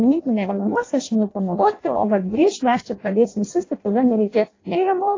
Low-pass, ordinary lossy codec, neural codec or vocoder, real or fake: 7.2 kHz; AAC, 32 kbps; codec, 16 kHz in and 24 kHz out, 0.6 kbps, FireRedTTS-2 codec; fake